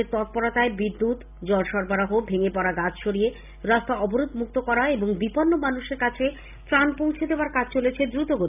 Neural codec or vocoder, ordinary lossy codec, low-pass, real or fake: none; none; 3.6 kHz; real